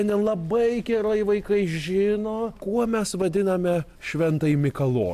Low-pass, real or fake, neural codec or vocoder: 14.4 kHz; real; none